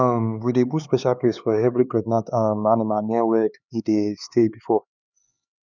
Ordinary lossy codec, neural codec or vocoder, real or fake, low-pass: none; codec, 16 kHz, 4 kbps, X-Codec, HuBERT features, trained on LibriSpeech; fake; 7.2 kHz